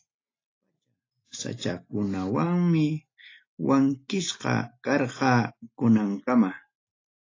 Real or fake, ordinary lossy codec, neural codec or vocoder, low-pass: real; AAC, 32 kbps; none; 7.2 kHz